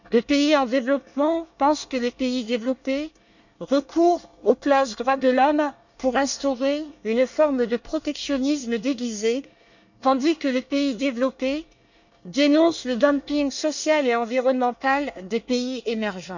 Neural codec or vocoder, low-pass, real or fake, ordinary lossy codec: codec, 24 kHz, 1 kbps, SNAC; 7.2 kHz; fake; none